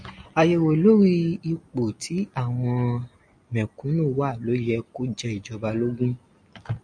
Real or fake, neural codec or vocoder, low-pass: real; none; 9.9 kHz